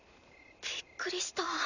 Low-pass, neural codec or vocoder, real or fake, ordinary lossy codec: 7.2 kHz; none; real; none